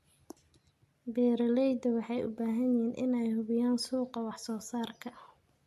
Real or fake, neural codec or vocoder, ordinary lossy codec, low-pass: real; none; MP3, 64 kbps; 14.4 kHz